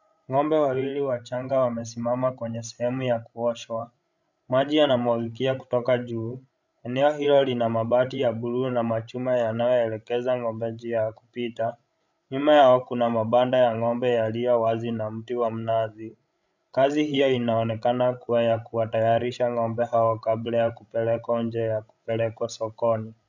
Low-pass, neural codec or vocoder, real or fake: 7.2 kHz; codec, 16 kHz, 16 kbps, FreqCodec, larger model; fake